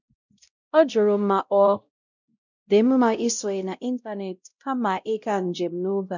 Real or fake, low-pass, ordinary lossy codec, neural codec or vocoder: fake; 7.2 kHz; none; codec, 16 kHz, 0.5 kbps, X-Codec, WavLM features, trained on Multilingual LibriSpeech